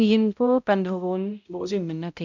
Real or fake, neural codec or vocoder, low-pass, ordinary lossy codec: fake; codec, 16 kHz, 0.5 kbps, X-Codec, HuBERT features, trained on balanced general audio; 7.2 kHz; none